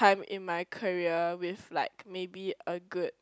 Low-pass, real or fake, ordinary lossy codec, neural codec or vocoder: none; real; none; none